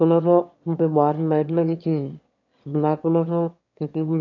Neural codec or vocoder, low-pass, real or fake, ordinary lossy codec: autoencoder, 22.05 kHz, a latent of 192 numbers a frame, VITS, trained on one speaker; 7.2 kHz; fake; AAC, 48 kbps